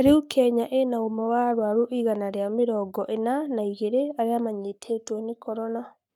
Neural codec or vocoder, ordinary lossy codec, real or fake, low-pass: codec, 44.1 kHz, 7.8 kbps, Pupu-Codec; none; fake; 19.8 kHz